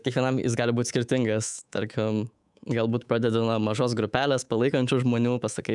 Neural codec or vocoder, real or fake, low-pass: codec, 24 kHz, 3.1 kbps, DualCodec; fake; 10.8 kHz